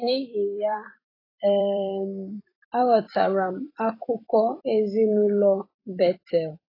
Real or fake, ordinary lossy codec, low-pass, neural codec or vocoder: fake; AAC, 24 kbps; 5.4 kHz; vocoder, 44.1 kHz, 128 mel bands every 256 samples, BigVGAN v2